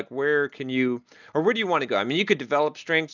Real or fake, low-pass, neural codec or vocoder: real; 7.2 kHz; none